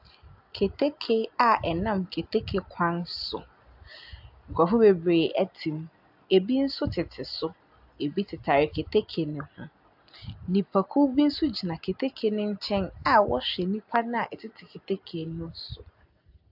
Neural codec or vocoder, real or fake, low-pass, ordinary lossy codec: none; real; 5.4 kHz; AAC, 48 kbps